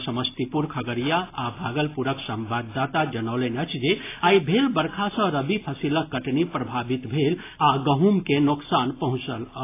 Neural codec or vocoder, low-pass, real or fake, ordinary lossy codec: none; 3.6 kHz; real; AAC, 24 kbps